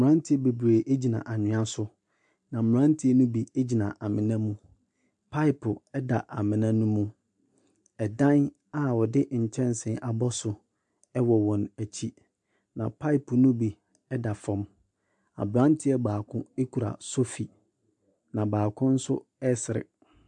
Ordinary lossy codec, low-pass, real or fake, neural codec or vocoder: MP3, 64 kbps; 10.8 kHz; real; none